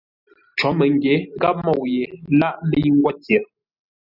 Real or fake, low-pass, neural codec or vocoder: real; 5.4 kHz; none